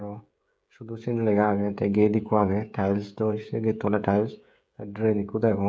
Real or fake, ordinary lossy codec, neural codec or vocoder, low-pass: fake; none; codec, 16 kHz, 16 kbps, FreqCodec, smaller model; none